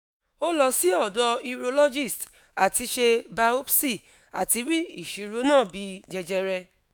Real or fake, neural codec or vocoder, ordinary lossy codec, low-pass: fake; autoencoder, 48 kHz, 128 numbers a frame, DAC-VAE, trained on Japanese speech; none; none